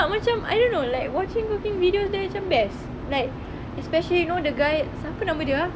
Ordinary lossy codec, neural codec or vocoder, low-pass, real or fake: none; none; none; real